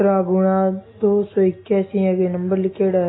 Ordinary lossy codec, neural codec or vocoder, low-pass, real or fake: AAC, 16 kbps; none; 7.2 kHz; real